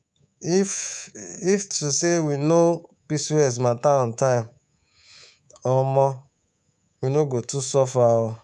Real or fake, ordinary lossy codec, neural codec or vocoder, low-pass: fake; none; codec, 24 kHz, 3.1 kbps, DualCodec; none